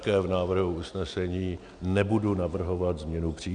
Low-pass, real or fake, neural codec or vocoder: 9.9 kHz; real; none